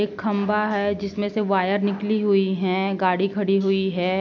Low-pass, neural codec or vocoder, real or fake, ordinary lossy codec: 7.2 kHz; none; real; none